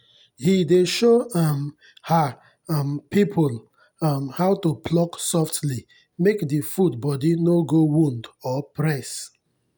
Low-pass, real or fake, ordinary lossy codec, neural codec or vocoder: none; real; none; none